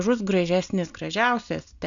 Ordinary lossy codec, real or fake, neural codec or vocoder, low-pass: MP3, 96 kbps; real; none; 7.2 kHz